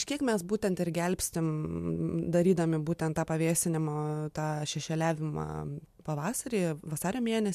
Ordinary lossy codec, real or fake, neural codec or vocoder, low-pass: MP3, 96 kbps; real; none; 14.4 kHz